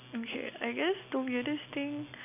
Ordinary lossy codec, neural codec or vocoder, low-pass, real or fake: AAC, 32 kbps; none; 3.6 kHz; real